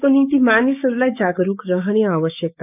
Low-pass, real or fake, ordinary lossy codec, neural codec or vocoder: 3.6 kHz; fake; none; codec, 16 kHz, 6 kbps, DAC